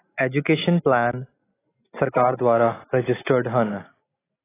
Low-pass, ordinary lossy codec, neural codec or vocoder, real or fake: 3.6 kHz; AAC, 16 kbps; none; real